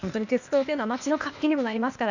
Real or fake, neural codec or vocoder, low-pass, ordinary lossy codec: fake; codec, 16 kHz, 0.8 kbps, ZipCodec; 7.2 kHz; none